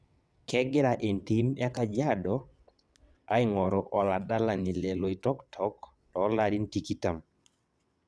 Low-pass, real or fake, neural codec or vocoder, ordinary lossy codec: none; fake; vocoder, 22.05 kHz, 80 mel bands, WaveNeXt; none